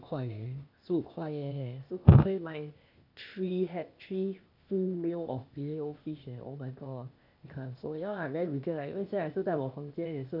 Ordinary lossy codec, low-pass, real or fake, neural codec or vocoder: none; 5.4 kHz; fake; codec, 16 kHz, 0.8 kbps, ZipCodec